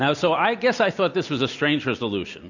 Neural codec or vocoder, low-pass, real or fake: none; 7.2 kHz; real